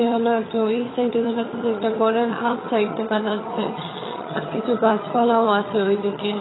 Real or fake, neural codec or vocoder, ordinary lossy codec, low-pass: fake; vocoder, 22.05 kHz, 80 mel bands, HiFi-GAN; AAC, 16 kbps; 7.2 kHz